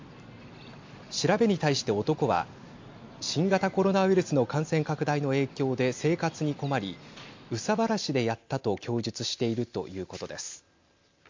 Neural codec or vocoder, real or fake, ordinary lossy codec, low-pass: none; real; MP3, 48 kbps; 7.2 kHz